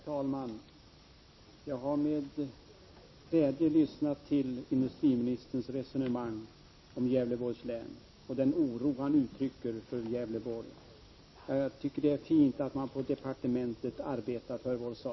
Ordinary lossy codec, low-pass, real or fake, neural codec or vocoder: MP3, 24 kbps; 7.2 kHz; real; none